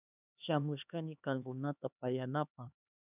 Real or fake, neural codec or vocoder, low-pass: fake; codec, 16 kHz, 2 kbps, X-Codec, HuBERT features, trained on LibriSpeech; 3.6 kHz